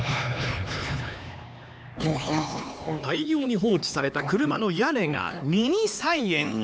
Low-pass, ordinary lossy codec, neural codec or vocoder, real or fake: none; none; codec, 16 kHz, 2 kbps, X-Codec, HuBERT features, trained on LibriSpeech; fake